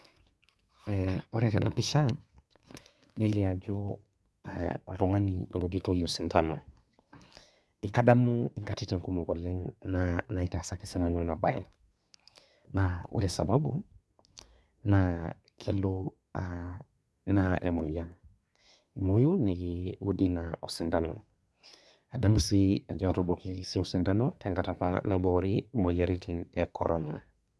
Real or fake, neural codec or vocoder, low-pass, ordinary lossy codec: fake; codec, 24 kHz, 1 kbps, SNAC; none; none